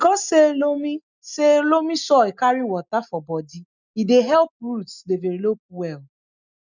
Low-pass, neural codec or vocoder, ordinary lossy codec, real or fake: 7.2 kHz; none; none; real